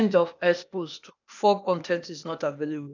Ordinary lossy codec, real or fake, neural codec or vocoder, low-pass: none; fake; codec, 16 kHz, 0.8 kbps, ZipCodec; 7.2 kHz